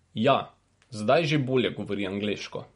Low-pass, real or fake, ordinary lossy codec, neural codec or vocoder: 10.8 kHz; real; MP3, 48 kbps; none